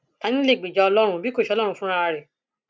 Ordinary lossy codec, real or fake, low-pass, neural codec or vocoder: none; real; none; none